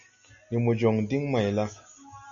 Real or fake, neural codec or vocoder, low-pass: real; none; 7.2 kHz